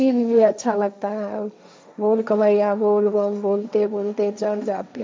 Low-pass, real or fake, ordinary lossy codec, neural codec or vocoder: none; fake; none; codec, 16 kHz, 1.1 kbps, Voila-Tokenizer